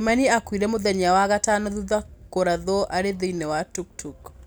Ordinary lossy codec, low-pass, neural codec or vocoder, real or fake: none; none; none; real